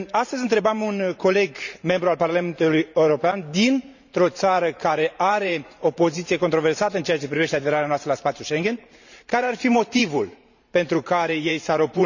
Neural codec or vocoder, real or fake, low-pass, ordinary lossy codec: vocoder, 44.1 kHz, 128 mel bands every 512 samples, BigVGAN v2; fake; 7.2 kHz; none